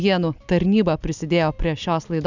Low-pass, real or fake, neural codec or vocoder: 7.2 kHz; real; none